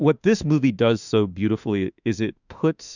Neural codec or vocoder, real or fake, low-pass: autoencoder, 48 kHz, 32 numbers a frame, DAC-VAE, trained on Japanese speech; fake; 7.2 kHz